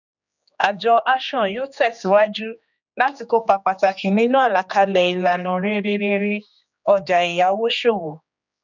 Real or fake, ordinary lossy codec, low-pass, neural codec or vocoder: fake; none; 7.2 kHz; codec, 16 kHz, 2 kbps, X-Codec, HuBERT features, trained on general audio